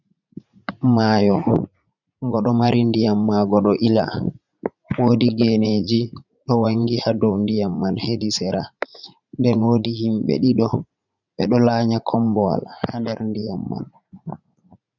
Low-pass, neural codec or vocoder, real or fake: 7.2 kHz; vocoder, 44.1 kHz, 80 mel bands, Vocos; fake